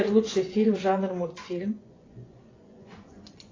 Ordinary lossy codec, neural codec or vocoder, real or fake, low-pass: AAC, 32 kbps; vocoder, 24 kHz, 100 mel bands, Vocos; fake; 7.2 kHz